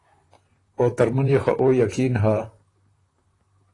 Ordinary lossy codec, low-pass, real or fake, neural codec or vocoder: AAC, 32 kbps; 10.8 kHz; fake; vocoder, 44.1 kHz, 128 mel bands, Pupu-Vocoder